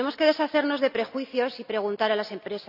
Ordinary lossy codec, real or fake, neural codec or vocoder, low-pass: none; real; none; 5.4 kHz